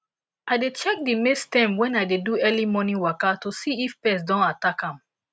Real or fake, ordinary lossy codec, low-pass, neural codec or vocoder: real; none; none; none